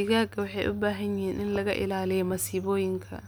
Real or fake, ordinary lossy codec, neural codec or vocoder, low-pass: real; none; none; none